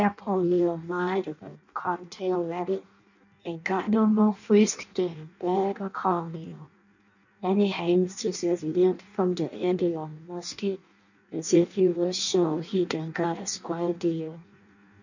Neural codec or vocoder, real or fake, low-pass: codec, 16 kHz in and 24 kHz out, 0.6 kbps, FireRedTTS-2 codec; fake; 7.2 kHz